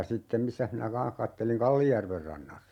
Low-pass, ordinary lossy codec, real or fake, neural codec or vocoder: 19.8 kHz; MP3, 96 kbps; real; none